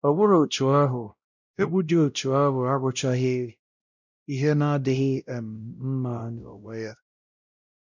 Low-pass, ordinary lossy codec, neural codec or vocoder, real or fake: 7.2 kHz; none; codec, 16 kHz, 0.5 kbps, X-Codec, WavLM features, trained on Multilingual LibriSpeech; fake